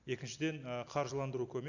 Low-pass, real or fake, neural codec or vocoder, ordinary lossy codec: 7.2 kHz; real; none; none